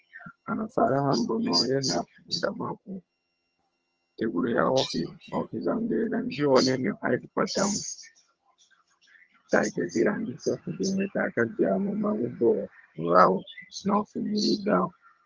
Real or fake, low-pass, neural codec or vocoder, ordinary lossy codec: fake; 7.2 kHz; vocoder, 22.05 kHz, 80 mel bands, HiFi-GAN; Opus, 24 kbps